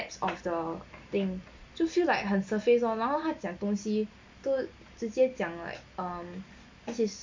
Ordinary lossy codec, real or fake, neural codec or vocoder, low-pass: MP3, 48 kbps; real; none; 7.2 kHz